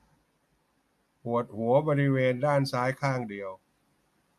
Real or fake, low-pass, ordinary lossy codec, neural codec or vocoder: real; 14.4 kHz; MP3, 64 kbps; none